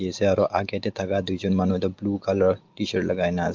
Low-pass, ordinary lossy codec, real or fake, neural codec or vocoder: 7.2 kHz; Opus, 32 kbps; real; none